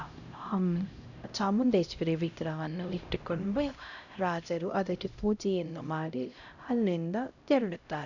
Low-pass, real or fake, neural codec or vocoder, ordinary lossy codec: 7.2 kHz; fake; codec, 16 kHz, 0.5 kbps, X-Codec, HuBERT features, trained on LibriSpeech; none